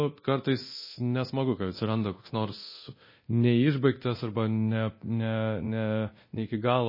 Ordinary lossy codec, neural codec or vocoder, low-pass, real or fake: MP3, 24 kbps; codec, 24 kHz, 0.9 kbps, DualCodec; 5.4 kHz; fake